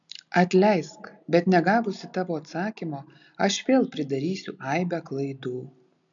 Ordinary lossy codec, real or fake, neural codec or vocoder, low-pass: MP3, 64 kbps; real; none; 7.2 kHz